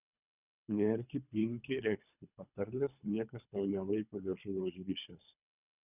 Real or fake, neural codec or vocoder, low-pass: fake; codec, 24 kHz, 3 kbps, HILCodec; 3.6 kHz